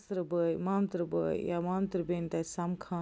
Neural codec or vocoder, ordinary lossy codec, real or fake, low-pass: none; none; real; none